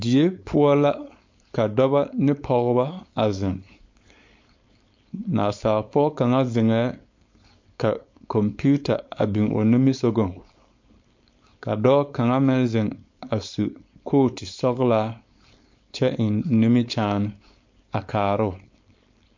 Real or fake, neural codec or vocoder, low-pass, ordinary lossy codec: fake; codec, 16 kHz, 4.8 kbps, FACodec; 7.2 kHz; MP3, 48 kbps